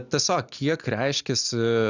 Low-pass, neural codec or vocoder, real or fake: 7.2 kHz; none; real